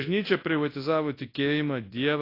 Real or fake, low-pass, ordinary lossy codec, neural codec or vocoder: fake; 5.4 kHz; AAC, 32 kbps; codec, 24 kHz, 0.9 kbps, WavTokenizer, large speech release